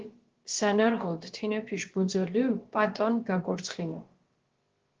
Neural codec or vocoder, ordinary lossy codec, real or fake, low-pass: codec, 16 kHz, about 1 kbps, DyCAST, with the encoder's durations; Opus, 16 kbps; fake; 7.2 kHz